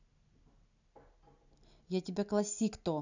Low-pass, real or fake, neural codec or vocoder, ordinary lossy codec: 7.2 kHz; real; none; none